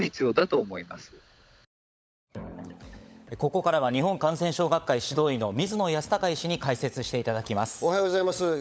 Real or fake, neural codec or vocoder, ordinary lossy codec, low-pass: fake; codec, 16 kHz, 16 kbps, FunCodec, trained on LibriTTS, 50 frames a second; none; none